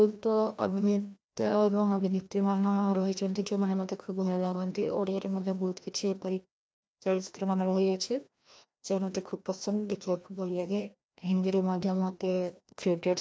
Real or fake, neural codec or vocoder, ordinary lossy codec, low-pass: fake; codec, 16 kHz, 1 kbps, FreqCodec, larger model; none; none